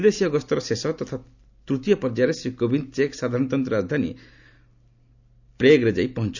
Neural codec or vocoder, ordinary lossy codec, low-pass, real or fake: none; none; 7.2 kHz; real